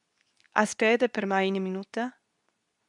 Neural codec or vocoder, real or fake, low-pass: codec, 24 kHz, 0.9 kbps, WavTokenizer, medium speech release version 2; fake; 10.8 kHz